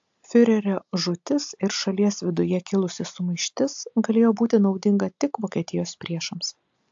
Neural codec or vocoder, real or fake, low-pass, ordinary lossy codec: none; real; 7.2 kHz; AAC, 64 kbps